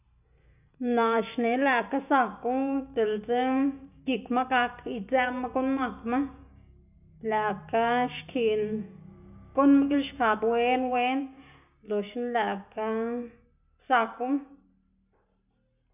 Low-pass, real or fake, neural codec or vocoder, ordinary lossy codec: 3.6 kHz; real; none; none